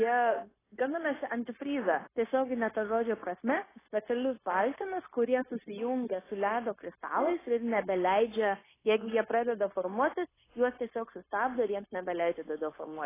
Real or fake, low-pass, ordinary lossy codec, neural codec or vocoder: fake; 3.6 kHz; AAC, 16 kbps; codec, 16 kHz, 0.9 kbps, LongCat-Audio-Codec